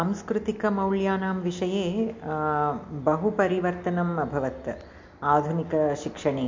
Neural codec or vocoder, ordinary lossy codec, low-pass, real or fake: none; MP3, 48 kbps; 7.2 kHz; real